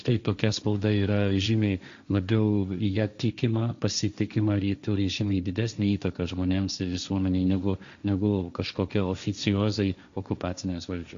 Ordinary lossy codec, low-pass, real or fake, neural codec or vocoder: Opus, 64 kbps; 7.2 kHz; fake; codec, 16 kHz, 1.1 kbps, Voila-Tokenizer